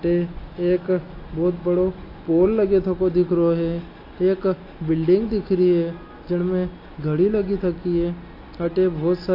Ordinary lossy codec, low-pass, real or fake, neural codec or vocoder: AAC, 32 kbps; 5.4 kHz; real; none